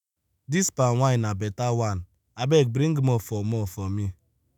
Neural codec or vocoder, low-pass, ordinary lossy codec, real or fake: autoencoder, 48 kHz, 128 numbers a frame, DAC-VAE, trained on Japanese speech; none; none; fake